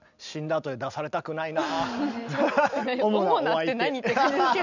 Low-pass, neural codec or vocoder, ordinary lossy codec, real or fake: 7.2 kHz; none; none; real